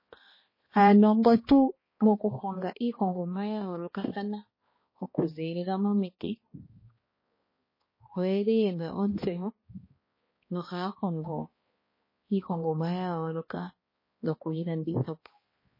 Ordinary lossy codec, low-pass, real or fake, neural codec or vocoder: MP3, 24 kbps; 5.4 kHz; fake; codec, 16 kHz, 1 kbps, X-Codec, HuBERT features, trained on balanced general audio